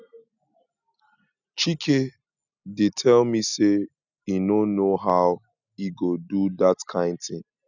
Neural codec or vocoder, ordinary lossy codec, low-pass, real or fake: none; none; 7.2 kHz; real